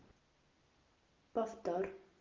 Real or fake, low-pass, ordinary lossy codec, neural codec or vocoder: real; 7.2 kHz; Opus, 32 kbps; none